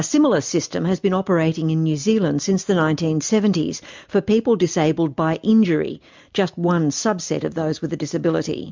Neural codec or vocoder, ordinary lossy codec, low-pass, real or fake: none; MP3, 64 kbps; 7.2 kHz; real